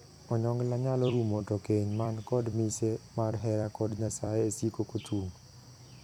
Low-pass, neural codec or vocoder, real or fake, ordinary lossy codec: 19.8 kHz; vocoder, 44.1 kHz, 128 mel bands every 256 samples, BigVGAN v2; fake; none